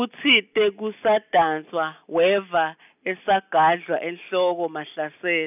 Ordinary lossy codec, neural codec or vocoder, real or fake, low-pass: none; none; real; 3.6 kHz